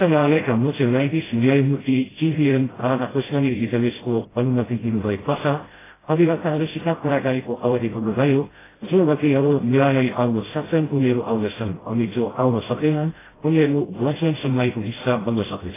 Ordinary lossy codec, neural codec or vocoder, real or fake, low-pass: AAC, 16 kbps; codec, 16 kHz, 0.5 kbps, FreqCodec, smaller model; fake; 3.6 kHz